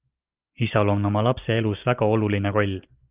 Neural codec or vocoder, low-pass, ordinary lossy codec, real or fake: none; 3.6 kHz; Opus, 32 kbps; real